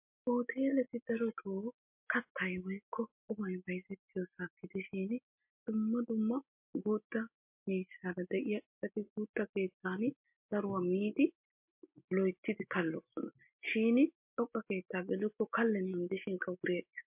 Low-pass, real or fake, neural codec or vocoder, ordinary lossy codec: 3.6 kHz; real; none; MP3, 32 kbps